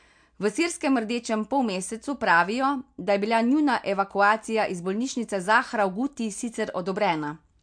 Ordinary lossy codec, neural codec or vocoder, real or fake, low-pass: MP3, 64 kbps; none; real; 9.9 kHz